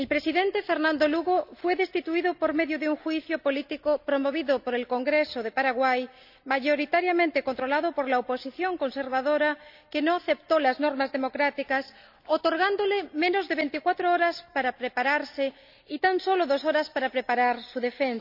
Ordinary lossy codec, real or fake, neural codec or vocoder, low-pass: none; real; none; 5.4 kHz